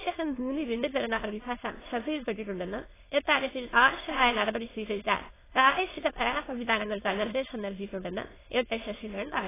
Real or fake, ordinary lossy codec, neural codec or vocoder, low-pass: fake; AAC, 16 kbps; autoencoder, 22.05 kHz, a latent of 192 numbers a frame, VITS, trained on many speakers; 3.6 kHz